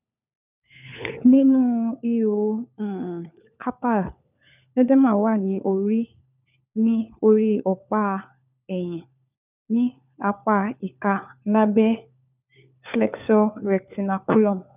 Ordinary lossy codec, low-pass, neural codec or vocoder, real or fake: none; 3.6 kHz; codec, 16 kHz, 4 kbps, FunCodec, trained on LibriTTS, 50 frames a second; fake